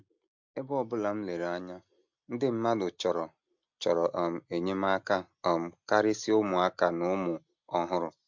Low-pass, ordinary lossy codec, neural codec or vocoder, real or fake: 7.2 kHz; MP3, 64 kbps; none; real